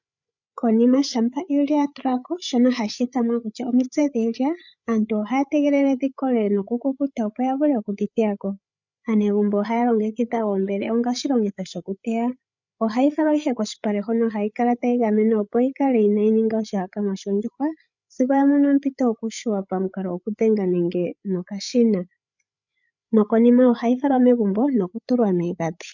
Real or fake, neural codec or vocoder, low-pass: fake; codec, 16 kHz, 8 kbps, FreqCodec, larger model; 7.2 kHz